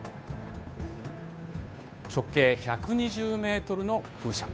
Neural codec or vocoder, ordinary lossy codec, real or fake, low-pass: codec, 16 kHz, 2 kbps, FunCodec, trained on Chinese and English, 25 frames a second; none; fake; none